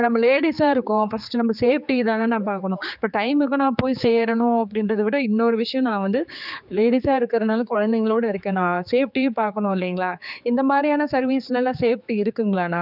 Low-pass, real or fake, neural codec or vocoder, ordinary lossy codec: 5.4 kHz; fake; codec, 16 kHz, 4 kbps, X-Codec, HuBERT features, trained on general audio; none